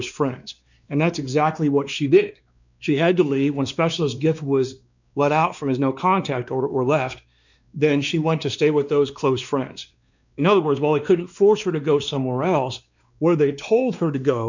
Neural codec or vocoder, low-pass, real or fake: codec, 16 kHz, 2 kbps, X-Codec, WavLM features, trained on Multilingual LibriSpeech; 7.2 kHz; fake